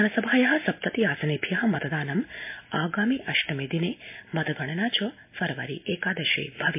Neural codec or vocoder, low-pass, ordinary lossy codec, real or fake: none; 3.6 kHz; MP3, 24 kbps; real